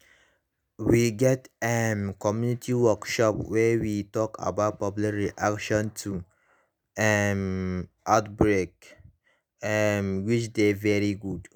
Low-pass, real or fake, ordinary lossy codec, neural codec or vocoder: none; real; none; none